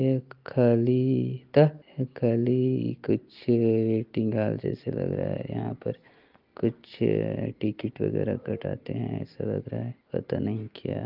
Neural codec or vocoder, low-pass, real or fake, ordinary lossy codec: none; 5.4 kHz; real; Opus, 32 kbps